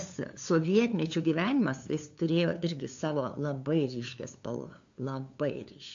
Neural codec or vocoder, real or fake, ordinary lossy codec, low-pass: codec, 16 kHz, 2 kbps, FunCodec, trained on LibriTTS, 25 frames a second; fake; AAC, 64 kbps; 7.2 kHz